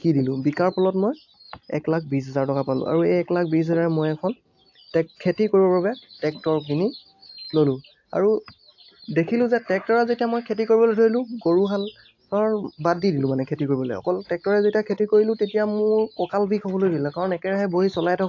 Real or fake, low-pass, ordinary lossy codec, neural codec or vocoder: real; 7.2 kHz; AAC, 48 kbps; none